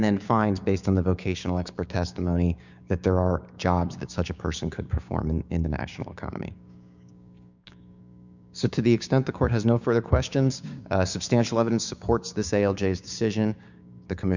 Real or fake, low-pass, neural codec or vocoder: fake; 7.2 kHz; codec, 16 kHz, 6 kbps, DAC